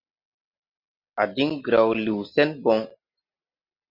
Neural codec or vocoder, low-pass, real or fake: none; 5.4 kHz; real